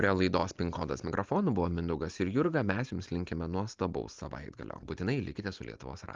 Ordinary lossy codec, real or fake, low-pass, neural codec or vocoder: Opus, 32 kbps; real; 7.2 kHz; none